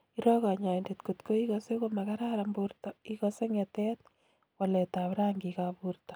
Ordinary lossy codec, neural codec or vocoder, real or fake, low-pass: none; none; real; none